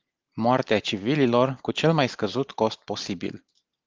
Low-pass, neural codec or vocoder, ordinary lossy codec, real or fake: 7.2 kHz; none; Opus, 32 kbps; real